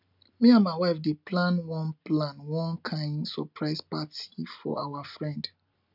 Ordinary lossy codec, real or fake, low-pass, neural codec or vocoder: none; real; 5.4 kHz; none